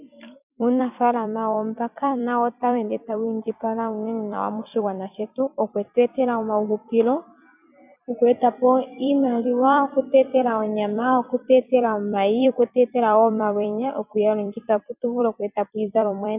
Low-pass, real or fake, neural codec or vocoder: 3.6 kHz; fake; vocoder, 44.1 kHz, 128 mel bands every 256 samples, BigVGAN v2